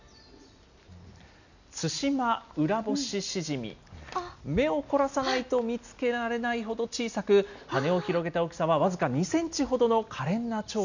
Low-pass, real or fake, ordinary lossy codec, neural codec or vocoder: 7.2 kHz; real; none; none